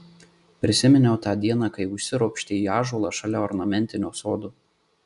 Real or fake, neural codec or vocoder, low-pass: real; none; 10.8 kHz